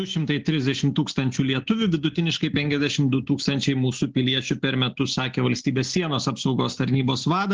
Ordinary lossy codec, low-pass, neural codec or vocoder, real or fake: Opus, 16 kbps; 7.2 kHz; none; real